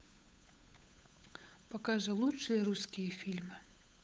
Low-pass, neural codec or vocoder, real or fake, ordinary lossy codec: none; codec, 16 kHz, 8 kbps, FunCodec, trained on Chinese and English, 25 frames a second; fake; none